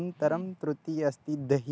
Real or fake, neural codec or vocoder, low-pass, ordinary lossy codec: real; none; none; none